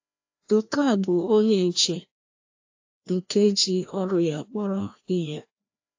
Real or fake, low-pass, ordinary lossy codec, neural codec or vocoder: fake; 7.2 kHz; AAC, 48 kbps; codec, 16 kHz, 1 kbps, FreqCodec, larger model